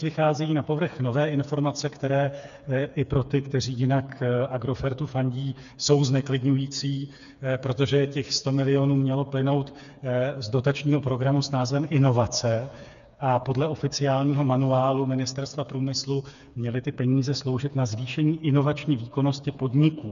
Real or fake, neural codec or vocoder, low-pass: fake; codec, 16 kHz, 4 kbps, FreqCodec, smaller model; 7.2 kHz